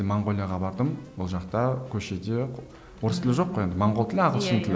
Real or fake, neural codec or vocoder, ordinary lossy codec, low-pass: real; none; none; none